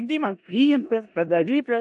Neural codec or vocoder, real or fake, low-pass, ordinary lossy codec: codec, 16 kHz in and 24 kHz out, 0.4 kbps, LongCat-Audio-Codec, four codebook decoder; fake; 10.8 kHz; AAC, 64 kbps